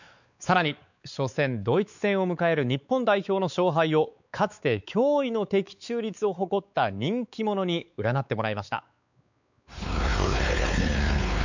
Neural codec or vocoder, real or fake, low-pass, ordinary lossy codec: codec, 16 kHz, 4 kbps, X-Codec, WavLM features, trained on Multilingual LibriSpeech; fake; 7.2 kHz; none